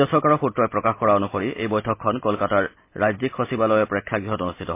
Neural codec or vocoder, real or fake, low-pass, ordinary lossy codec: none; real; 3.6 kHz; MP3, 24 kbps